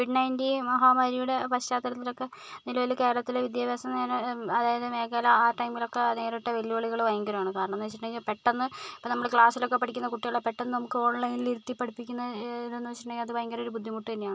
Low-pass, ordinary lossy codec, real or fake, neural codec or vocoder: none; none; real; none